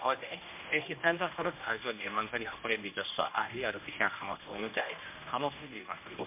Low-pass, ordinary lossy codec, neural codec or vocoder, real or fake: 3.6 kHz; MP3, 32 kbps; codec, 16 kHz, 1 kbps, X-Codec, HuBERT features, trained on general audio; fake